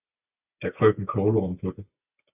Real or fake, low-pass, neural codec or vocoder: real; 3.6 kHz; none